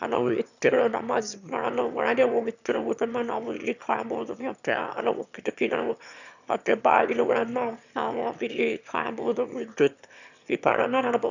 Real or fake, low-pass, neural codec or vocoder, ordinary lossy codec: fake; 7.2 kHz; autoencoder, 22.05 kHz, a latent of 192 numbers a frame, VITS, trained on one speaker; none